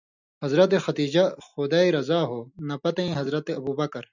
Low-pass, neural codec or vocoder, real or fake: 7.2 kHz; none; real